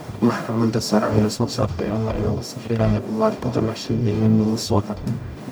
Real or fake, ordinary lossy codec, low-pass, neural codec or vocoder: fake; none; none; codec, 44.1 kHz, 0.9 kbps, DAC